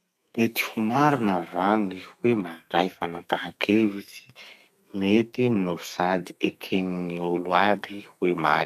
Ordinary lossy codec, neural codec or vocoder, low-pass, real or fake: none; codec, 32 kHz, 1.9 kbps, SNAC; 14.4 kHz; fake